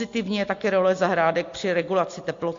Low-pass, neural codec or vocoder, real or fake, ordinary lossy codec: 7.2 kHz; none; real; AAC, 48 kbps